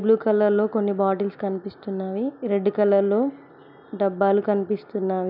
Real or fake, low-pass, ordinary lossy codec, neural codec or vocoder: real; 5.4 kHz; none; none